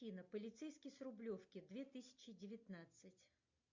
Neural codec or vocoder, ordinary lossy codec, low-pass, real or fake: none; MP3, 48 kbps; 7.2 kHz; real